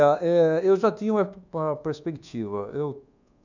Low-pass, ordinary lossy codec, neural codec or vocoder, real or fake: 7.2 kHz; none; codec, 24 kHz, 1.2 kbps, DualCodec; fake